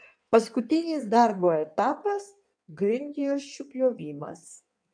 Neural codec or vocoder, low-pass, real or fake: codec, 16 kHz in and 24 kHz out, 1.1 kbps, FireRedTTS-2 codec; 9.9 kHz; fake